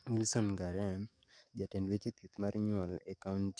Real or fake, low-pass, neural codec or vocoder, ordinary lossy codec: fake; 9.9 kHz; codec, 44.1 kHz, 7.8 kbps, DAC; none